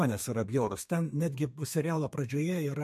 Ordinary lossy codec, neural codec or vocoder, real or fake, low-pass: MP3, 64 kbps; codec, 32 kHz, 1.9 kbps, SNAC; fake; 14.4 kHz